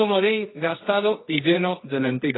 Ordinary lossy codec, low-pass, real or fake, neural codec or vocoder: AAC, 16 kbps; 7.2 kHz; fake; codec, 24 kHz, 0.9 kbps, WavTokenizer, medium music audio release